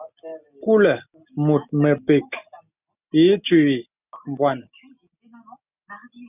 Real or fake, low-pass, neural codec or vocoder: real; 3.6 kHz; none